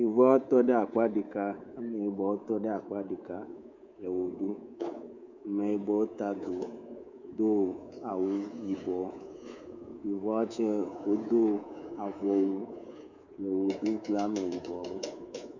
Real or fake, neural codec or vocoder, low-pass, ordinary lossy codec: fake; codec, 24 kHz, 3.1 kbps, DualCodec; 7.2 kHz; Opus, 64 kbps